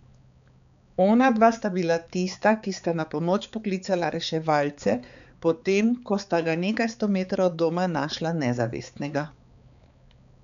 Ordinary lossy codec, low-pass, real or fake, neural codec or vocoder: none; 7.2 kHz; fake; codec, 16 kHz, 4 kbps, X-Codec, HuBERT features, trained on balanced general audio